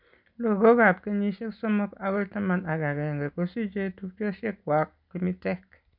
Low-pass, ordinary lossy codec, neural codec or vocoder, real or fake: 5.4 kHz; none; none; real